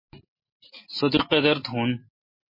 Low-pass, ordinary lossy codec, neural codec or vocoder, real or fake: 5.4 kHz; MP3, 24 kbps; none; real